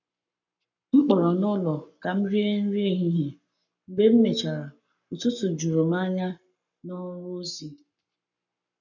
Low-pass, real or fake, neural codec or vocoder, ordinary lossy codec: 7.2 kHz; fake; codec, 44.1 kHz, 7.8 kbps, Pupu-Codec; none